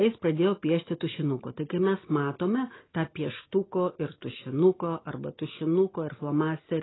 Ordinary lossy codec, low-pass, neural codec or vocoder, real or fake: AAC, 16 kbps; 7.2 kHz; none; real